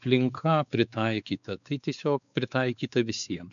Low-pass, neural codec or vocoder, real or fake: 7.2 kHz; codec, 16 kHz, 4 kbps, X-Codec, WavLM features, trained on Multilingual LibriSpeech; fake